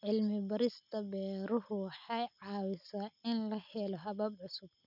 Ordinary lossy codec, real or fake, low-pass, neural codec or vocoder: none; real; 5.4 kHz; none